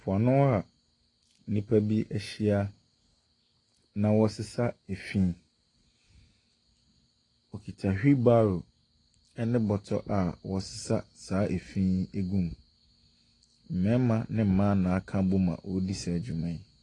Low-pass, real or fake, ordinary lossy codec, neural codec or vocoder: 10.8 kHz; real; AAC, 32 kbps; none